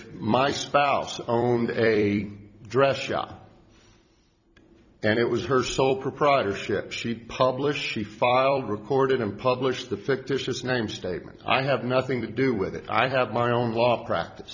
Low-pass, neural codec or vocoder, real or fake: 7.2 kHz; vocoder, 44.1 kHz, 128 mel bands every 256 samples, BigVGAN v2; fake